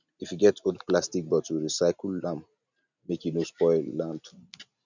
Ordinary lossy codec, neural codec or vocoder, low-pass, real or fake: none; none; 7.2 kHz; real